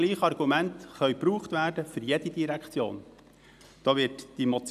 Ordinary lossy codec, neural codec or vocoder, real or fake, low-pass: none; none; real; 14.4 kHz